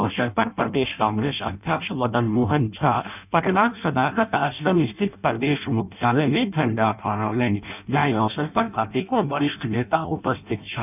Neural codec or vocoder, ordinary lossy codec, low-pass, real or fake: codec, 16 kHz in and 24 kHz out, 0.6 kbps, FireRedTTS-2 codec; none; 3.6 kHz; fake